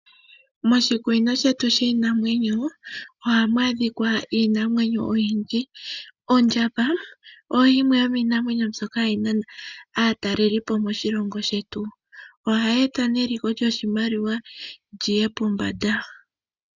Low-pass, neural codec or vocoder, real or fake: 7.2 kHz; none; real